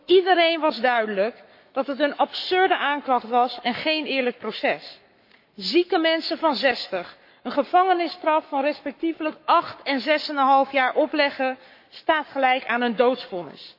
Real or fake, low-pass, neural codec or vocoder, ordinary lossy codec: fake; 5.4 kHz; codec, 44.1 kHz, 7.8 kbps, Pupu-Codec; MP3, 32 kbps